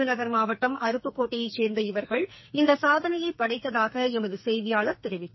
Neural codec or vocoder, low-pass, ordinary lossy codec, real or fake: codec, 44.1 kHz, 2.6 kbps, SNAC; 7.2 kHz; MP3, 24 kbps; fake